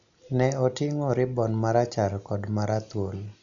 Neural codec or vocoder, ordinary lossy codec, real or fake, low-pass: none; none; real; 7.2 kHz